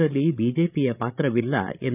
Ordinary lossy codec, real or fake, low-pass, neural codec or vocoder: none; fake; 3.6 kHz; codec, 16 kHz, 16 kbps, FreqCodec, larger model